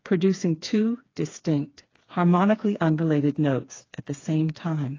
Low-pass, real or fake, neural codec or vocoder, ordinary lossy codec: 7.2 kHz; fake; codec, 16 kHz, 4 kbps, FreqCodec, smaller model; AAC, 32 kbps